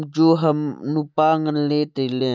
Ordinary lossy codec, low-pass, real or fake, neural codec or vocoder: none; none; real; none